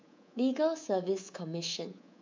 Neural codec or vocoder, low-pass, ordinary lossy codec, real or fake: codec, 24 kHz, 3.1 kbps, DualCodec; 7.2 kHz; MP3, 64 kbps; fake